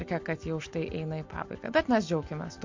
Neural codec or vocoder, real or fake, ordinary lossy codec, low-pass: none; real; MP3, 48 kbps; 7.2 kHz